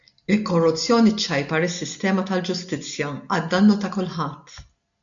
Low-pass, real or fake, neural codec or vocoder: 7.2 kHz; real; none